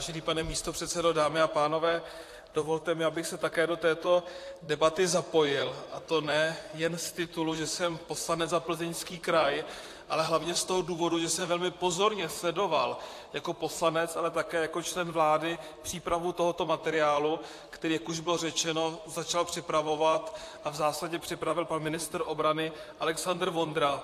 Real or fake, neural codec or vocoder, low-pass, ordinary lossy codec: fake; vocoder, 44.1 kHz, 128 mel bands, Pupu-Vocoder; 14.4 kHz; AAC, 64 kbps